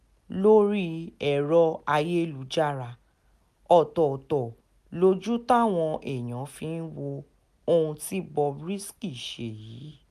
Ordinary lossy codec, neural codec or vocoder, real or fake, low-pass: none; none; real; 14.4 kHz